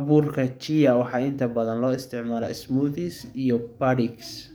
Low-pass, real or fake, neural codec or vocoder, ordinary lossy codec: none; fake; codec, 44.1 kHz, 7.8 kbps, DAC; none